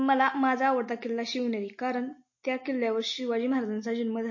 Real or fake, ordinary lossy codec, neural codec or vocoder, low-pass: real; MP3, 32 kbps; none; 7.2 kHz